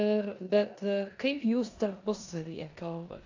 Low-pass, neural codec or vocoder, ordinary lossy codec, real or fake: 7.2 kHz; codec, 16 kHz in and 24 kHz out, 0.9 kbps, LongCat-Audio-Codec, four codebook decoder; AAC, 48 kbps; fake